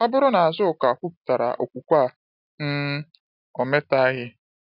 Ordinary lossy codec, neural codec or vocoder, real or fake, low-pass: none; none; real; 5.4 kHz